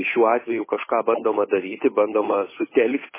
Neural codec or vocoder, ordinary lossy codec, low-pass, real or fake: codec, 16 kHz, 8 kbps, FunCodec, trained on Chinese and English, 25 frames a second; MP3, 16 kbps; 3.6 kHz; fake